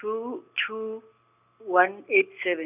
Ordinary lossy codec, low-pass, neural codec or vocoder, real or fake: none; 3.6 kHz; none; real